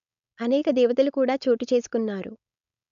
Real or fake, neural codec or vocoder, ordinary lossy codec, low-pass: fake; codec, 16 kHz, 4.8 kbps, FACodec; none; 7.2 kHz